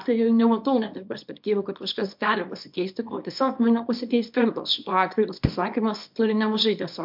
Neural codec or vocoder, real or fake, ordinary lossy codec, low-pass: codec, 24 kHz, 0.9 kbps, WavTokenizer, small release; fake; MP3, 48 kbps; 5.4 kHz